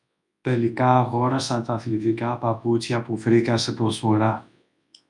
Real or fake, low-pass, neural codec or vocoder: fake; 10.8 kHz; codec, 24 kHz, 0.9 kbps, WavTokenizer, large speech release